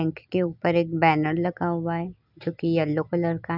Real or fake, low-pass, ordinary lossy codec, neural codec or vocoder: real; 5.4 kHz; none; none